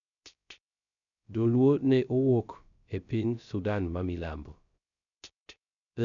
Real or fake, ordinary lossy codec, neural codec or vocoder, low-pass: fake; none; codec, 16 kHz, 0.3 kbps, FocalCodec; 7.2 kHz